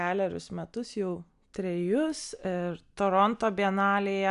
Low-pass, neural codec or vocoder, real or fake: 10.8 kHz; none; real